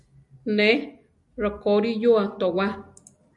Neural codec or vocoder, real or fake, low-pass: none; real; 10.8 kHz